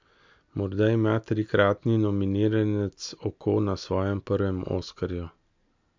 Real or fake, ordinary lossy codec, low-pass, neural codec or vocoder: real; MP3, 64 kbps; 7.2 kHz; none